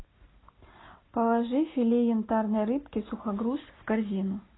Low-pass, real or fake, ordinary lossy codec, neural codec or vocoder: 7.2 kHz; real; AAC, 16 kbps; none